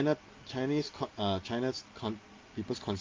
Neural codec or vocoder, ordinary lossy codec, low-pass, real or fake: none; Opus, 24 kbps; 7.2 kHz; real